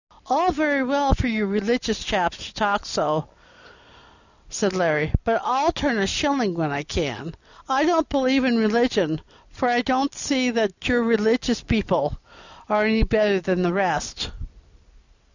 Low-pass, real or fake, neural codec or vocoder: 7.2 kHz; real; none